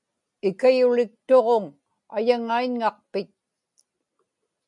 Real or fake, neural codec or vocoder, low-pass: real; none; 10.8 kHz